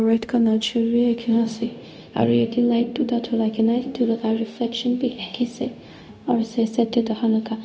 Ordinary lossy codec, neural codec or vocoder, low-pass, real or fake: none; codec, 16 kHz, 0.4 kbps, LongCat-Audio-Codec; none; fake